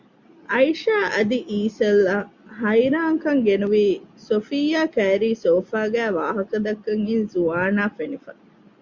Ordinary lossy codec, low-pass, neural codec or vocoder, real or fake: Opus, 64 kbps; 7.2 kHz; none; real